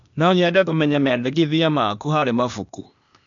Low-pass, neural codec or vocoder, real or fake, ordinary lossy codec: 7.2 kHz; codec, 16 kHz, 0.8 kbps, ZipCodec; fake; none